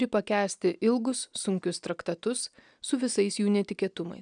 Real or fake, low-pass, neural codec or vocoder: real; 9.9 kHz; none